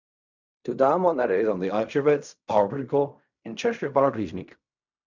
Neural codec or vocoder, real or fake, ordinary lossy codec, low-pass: codec, 16 kHz in and 24 kHz out, 0.4 kbps, LongCat-Audio-Codec, fine tuned four codebook decoder; fake; none; 7.2 kHz